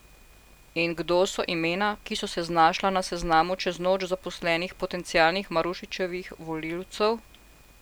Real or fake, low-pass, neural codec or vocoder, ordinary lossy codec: real; none; none; none